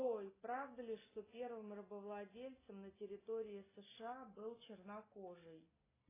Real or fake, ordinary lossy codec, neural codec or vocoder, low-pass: real; AAC, 16 kbps; none; 3.6 kHz